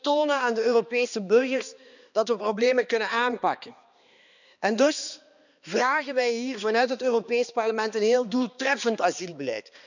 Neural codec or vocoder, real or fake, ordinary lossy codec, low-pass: codec, 16 kHz, 2 kbps, X-Codec, HuBERT features, trained on balanced general audio; fake; none; 7.2 kHz